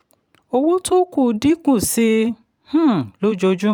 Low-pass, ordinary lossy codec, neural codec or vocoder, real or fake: 19.8 kHz; none; vocoder, 44.1 kHz, 128 mel bands every 512 samples, BigVGAN v2; fake